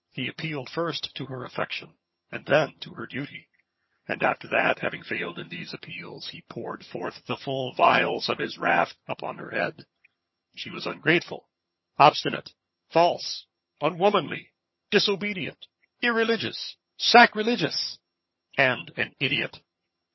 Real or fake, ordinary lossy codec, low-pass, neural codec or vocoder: fake; MP3, 24 kbps; 7.2 kHz; vocoder, 22.05 kHz, 80 mel bands, HiFi-GAN